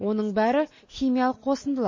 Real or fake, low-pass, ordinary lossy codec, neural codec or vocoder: real; 7.2 kHz; MP3, 32 kbps; none